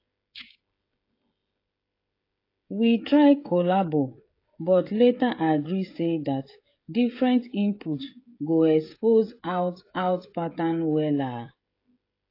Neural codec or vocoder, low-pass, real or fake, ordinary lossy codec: codec, 16 kHz, 16 kbps, FreqCodec, smaller model; 5.4 kHz; fake; AAC, 32 kbps